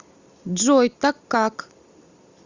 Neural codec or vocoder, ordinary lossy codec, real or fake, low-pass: none; Opus, 64 kbps; real; 7.2 kHz